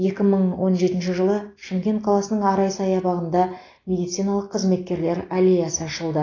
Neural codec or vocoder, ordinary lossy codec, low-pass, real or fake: none; AAC, 32 kbps; 7.2 kHz; real